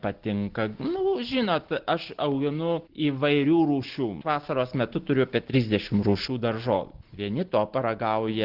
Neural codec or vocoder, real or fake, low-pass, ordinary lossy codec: none; real; 5.4 kHz; Opus, 16 kbps